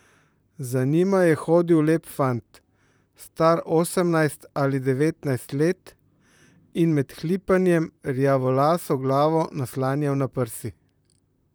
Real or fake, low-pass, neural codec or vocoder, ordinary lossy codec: fake; none; vocoder, 44.1 kHz, 128 mel bands, Pupu-Vocoder; none